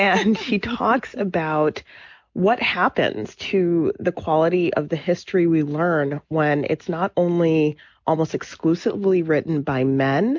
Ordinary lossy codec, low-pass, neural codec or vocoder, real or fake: MP3, 64 kbps; 7.2 kHz; none; real